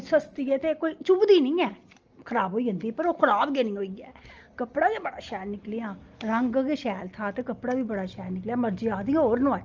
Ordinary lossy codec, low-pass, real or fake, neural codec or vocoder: Opus, 24 kbps; 7.2 kHz; real; none